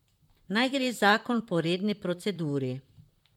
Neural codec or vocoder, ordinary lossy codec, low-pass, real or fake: vocoder, 44.1 kHz, 128 mel bands, Pupu-Vocoder; MP3, 96 kbps; 19.8 kHz; fake